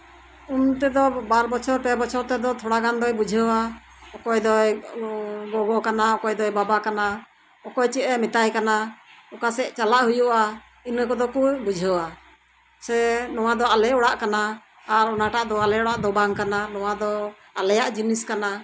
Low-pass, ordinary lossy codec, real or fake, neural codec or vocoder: none; none; real; none